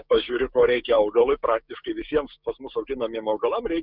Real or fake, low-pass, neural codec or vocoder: fake; 5.4 kHz; codec, 16 kHz, 6 kbps, DAC